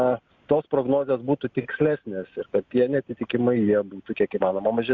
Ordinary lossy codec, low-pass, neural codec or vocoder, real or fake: Opus, 64 kbps; 7.2 kHz; none; real